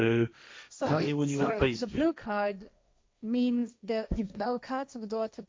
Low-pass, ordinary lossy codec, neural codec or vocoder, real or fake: none; none; codec, 16 kHz, 1.1 kbps, Voila-Tokenizer; fake